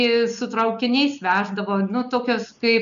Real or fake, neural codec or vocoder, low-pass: real; none; 7.2 kHz